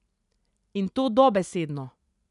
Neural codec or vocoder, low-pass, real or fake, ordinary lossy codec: none; 10.8 kHz; real; none